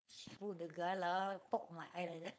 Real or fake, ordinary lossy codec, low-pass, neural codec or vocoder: fake; none; none; codec, 16 kHz, 4.8 kbps, FACodec